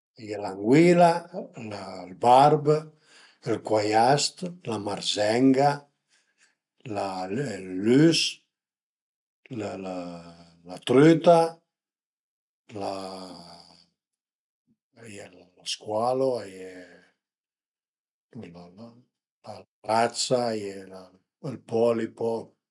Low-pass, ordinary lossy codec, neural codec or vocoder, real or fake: 10.8 kHz; none; none; real